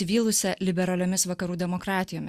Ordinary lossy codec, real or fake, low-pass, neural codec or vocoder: Opus, 64 kbps; real; 14.4 kHz; none